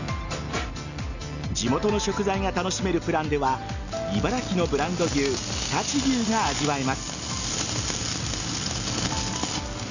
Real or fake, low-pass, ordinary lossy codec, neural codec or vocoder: real; 7.2 kHz; none; none